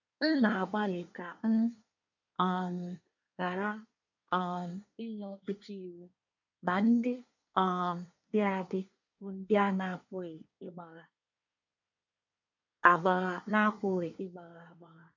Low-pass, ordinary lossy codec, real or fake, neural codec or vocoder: 7.2 kHz; none; fake; codec, 24 kHz, 1 kbps, SNAC